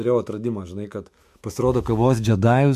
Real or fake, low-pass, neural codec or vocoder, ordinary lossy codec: fake; 14.4 kHz; autoencoder, 48 kHz, 128 numbers a frame, DAC-VAE, trained on Japanese speech; MP3, 64 kbps